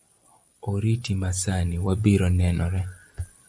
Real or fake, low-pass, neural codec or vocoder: real; 9.9 kHz; none